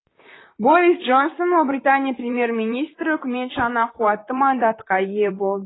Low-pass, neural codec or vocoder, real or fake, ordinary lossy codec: 7.2 kHz; vocoder, 44.1 kHz, 128 mel bands, Pupu-Vocoder; fake; AAC, 16 kbps